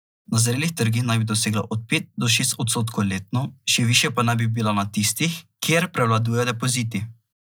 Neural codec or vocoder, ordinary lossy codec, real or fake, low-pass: none; none; real; none